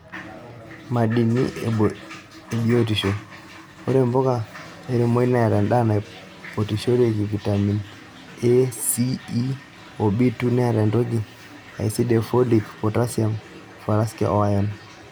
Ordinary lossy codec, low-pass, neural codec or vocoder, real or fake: none; none; none; real